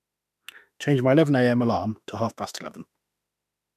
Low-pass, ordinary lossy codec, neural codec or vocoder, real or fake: 14.4 kHz; none; autoencoder, 48 kHz, 32 numbers a frame, DAC-VAE, trained on Japanese speech; fake